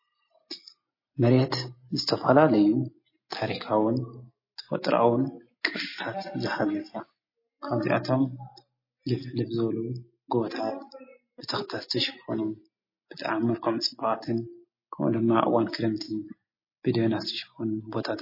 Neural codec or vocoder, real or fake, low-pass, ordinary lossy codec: none; real; 5.4 kHz; MP3, 24 kbps